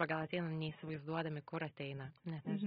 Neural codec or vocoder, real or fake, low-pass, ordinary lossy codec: none; real; 19.8 kHz; AAC, 16 kbps